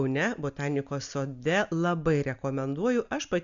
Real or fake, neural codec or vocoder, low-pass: real; none; 7.2 kHz